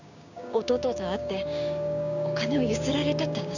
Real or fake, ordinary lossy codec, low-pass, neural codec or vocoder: fake; none; 7.2 kHz; codec, 16 kHz, 6 kbps, DAC